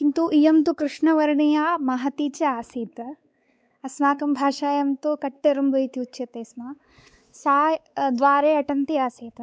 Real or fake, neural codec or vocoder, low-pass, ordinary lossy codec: fake; codec, 16 kHz, 4 kbps, X-Codec, WavLM features, trained on Multilingual LibriSpeech; none; none